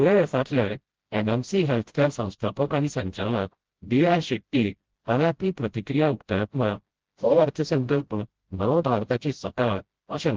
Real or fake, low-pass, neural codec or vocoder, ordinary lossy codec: fake; 7.2 kHz; codec, 16 kHz, 0.5 kbps, FreqCodec, smaller model; Opus, 16 kbps